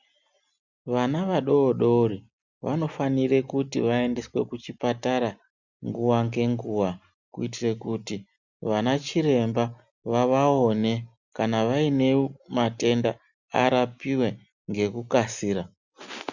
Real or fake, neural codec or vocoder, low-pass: real; none; 7.2 kHz